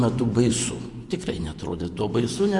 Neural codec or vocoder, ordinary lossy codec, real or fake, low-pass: vocoder, 24 kHz, 100 mel bands, Vocos; Opus, 64 kbps; fake; 10.8 kHz